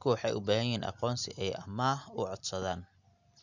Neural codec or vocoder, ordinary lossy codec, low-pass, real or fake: none; none; 7.2 kHz; real